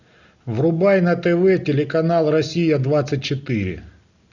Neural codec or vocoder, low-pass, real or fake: none; 7.2 kHz; real